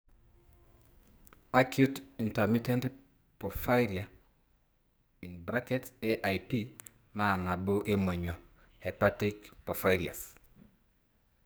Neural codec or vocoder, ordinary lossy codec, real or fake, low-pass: codec, 44.1 kHz, 2.6 kbps, SNAC; none; fake; none